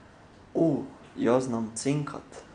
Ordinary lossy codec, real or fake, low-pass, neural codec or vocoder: none; real; 9.9 kHz; none